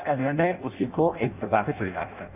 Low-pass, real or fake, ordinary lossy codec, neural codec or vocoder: 3.6 kHz; fake; none; codec, 16 kHz in and 24 kHz out, 0.6 kbps, FireRedTTS-2 codec